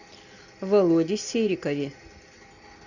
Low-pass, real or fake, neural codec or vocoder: 7.2 kHz; real; none